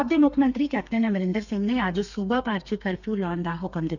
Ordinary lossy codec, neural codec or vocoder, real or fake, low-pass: none; codec, 32 kHz, 1.9 kbps, SNAC; fake; 7.2 kHz